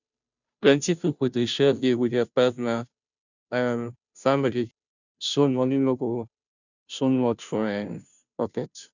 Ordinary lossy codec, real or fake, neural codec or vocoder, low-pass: none; fake; codec, 16 kHz, 0.5 kbps, FunCodec, trained on Chinese and English, 25 frames a second; 7.2 kHz